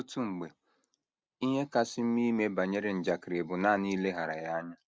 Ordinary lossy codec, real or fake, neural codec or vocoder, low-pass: none; real; none; none